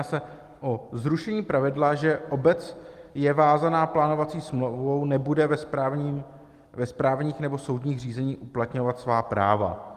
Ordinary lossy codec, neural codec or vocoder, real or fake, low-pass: Opus, 24 kbps; none; real; 14.4 kHz